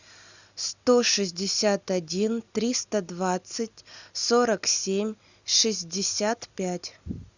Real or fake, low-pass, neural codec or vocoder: real; 7.2 kHz; none